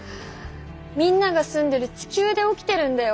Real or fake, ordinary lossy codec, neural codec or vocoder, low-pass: real; none; none; none